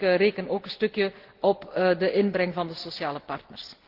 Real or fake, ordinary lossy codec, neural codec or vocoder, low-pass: real; Opus, 16 kbps; none; 5.4 kHz